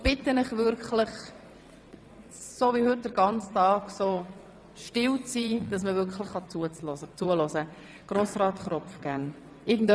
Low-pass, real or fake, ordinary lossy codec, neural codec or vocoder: none; fake; none; vocoder, 22.05 kHz, 80 mel bands, WaveNeXt